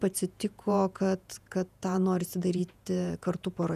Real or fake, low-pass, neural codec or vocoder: fake; 14.4 kHz; vocoder, 48 kHz, 128 mel bands, Vocos